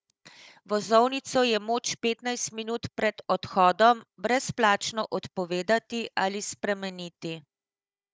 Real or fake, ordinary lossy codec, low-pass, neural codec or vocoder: fake; none; none; codec, 16 kHz, 16 kbps, FunCodec, trained on Chinese and English, 50 frames a second